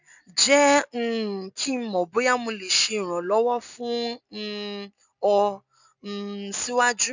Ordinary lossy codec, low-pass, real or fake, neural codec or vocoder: none; 7.2 kHz; real; none